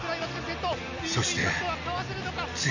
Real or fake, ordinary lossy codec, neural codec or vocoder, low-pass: real; none; none; 7.2 kHz